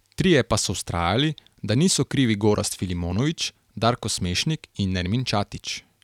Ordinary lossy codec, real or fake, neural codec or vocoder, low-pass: none; real; none; 19.8 kHz